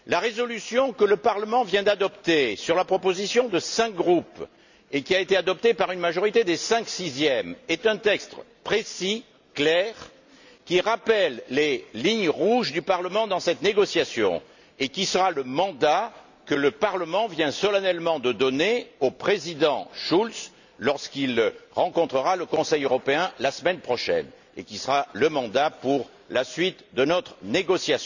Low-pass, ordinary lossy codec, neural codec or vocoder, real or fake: 7.2 kHz; none; none; real